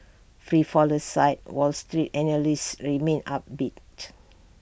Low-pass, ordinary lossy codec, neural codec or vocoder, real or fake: none; none; none; real